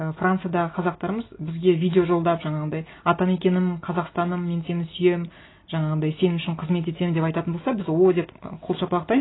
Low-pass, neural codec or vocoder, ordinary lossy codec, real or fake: 7.2 kHz; none; AAC, 16 kbps; real